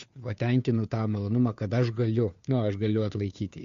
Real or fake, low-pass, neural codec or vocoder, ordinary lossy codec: fake; 7.2 kHz; codec, 16 kHz, 2 kbps, FunCodec, trained on Chinese and English, 25 frames a second; AAC, 64 kbps